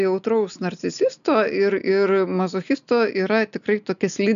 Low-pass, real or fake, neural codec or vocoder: 7.2 kHz; real; none